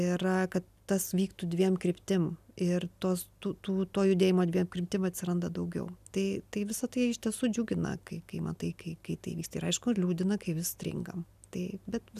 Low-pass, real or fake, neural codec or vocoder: 14.4 kHz; real; none